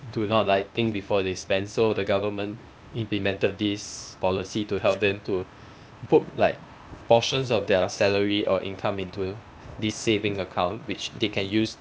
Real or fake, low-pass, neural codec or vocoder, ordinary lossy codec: fake; none; codec, 16 kHz, 0.8 kbps, ZipCodec; none